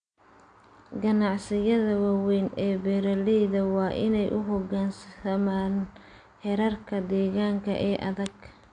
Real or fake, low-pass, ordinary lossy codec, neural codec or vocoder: real; 9.9 kHz; AAC, 64 kbps; none